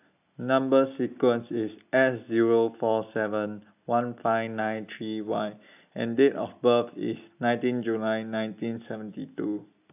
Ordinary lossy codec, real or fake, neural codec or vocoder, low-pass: AAC, 32 kbps; real; none; 3.6 kHz